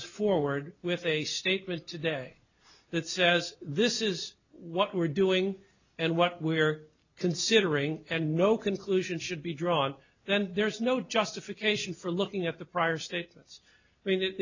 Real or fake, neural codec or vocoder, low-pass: real; none; 7.2 kHz